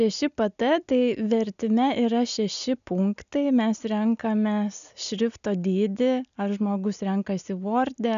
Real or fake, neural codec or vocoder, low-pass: real; none; 7.2 kHz